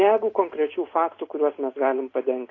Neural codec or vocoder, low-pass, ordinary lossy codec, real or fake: none; 7.2 kHz; AAC, 32 kbps; real